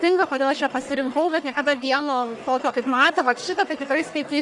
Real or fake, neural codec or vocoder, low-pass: fake; codec, 44.1 kHz, 1.7 kbps, Pupu-Codec; 10.8 kHz